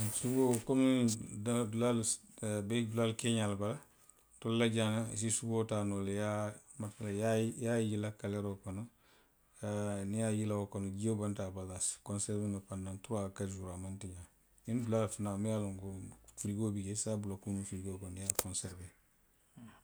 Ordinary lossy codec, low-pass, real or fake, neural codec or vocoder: none; none; real; none